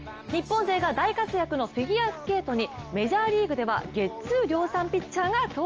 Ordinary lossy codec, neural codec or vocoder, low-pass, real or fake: Opus, 24 kbps; none; 7.2 kHz; real